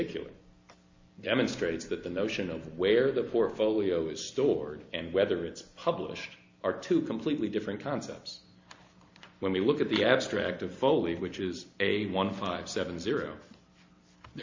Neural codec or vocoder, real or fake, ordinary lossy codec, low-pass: none; real; MP3, 48 kbps; 7.2 kHz